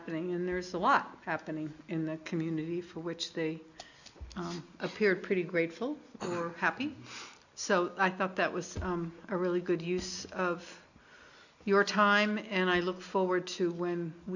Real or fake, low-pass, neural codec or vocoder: real; 7.2 kHz; none